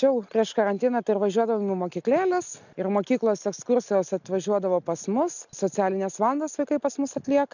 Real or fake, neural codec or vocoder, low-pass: real; none; 7.2 kHz